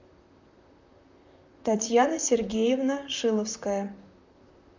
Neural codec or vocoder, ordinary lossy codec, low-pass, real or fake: vocoder, 44.1 kHz, 128 mel bands, Pupu-Vocoder; MP3, 64 kbps; 7.2 kHz; fake